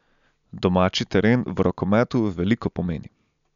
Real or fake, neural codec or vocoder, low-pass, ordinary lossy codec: real; none; 7.2 kHz; none